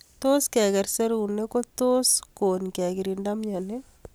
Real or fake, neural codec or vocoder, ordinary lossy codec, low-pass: real; none; none; none